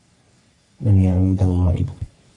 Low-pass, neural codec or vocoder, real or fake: 10.8 kHz; codec, 44.1 kHz, 3.4 kbps, Pupu-Codec; fake